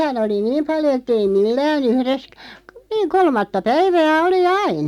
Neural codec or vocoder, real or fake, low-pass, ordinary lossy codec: none; real; 19.8 kHz; none